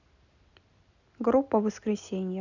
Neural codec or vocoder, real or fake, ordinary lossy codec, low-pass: none; real; none; 7.2 kHz